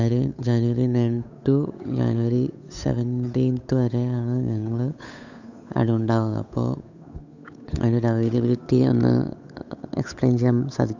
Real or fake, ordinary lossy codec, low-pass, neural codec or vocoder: fake; none; 7.2 kHz; codec, 16 kHz, 8 kbps, FunCodec, trained on Chinese and English, 25 frames a second